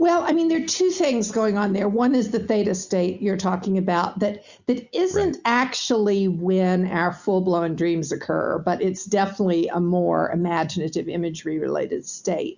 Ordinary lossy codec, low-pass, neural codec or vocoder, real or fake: Opus, 64 kbps; 7.2 kHz; none; real